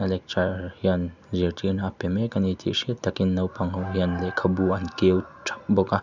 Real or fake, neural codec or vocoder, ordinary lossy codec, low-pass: real; none; none; 7.2 kHz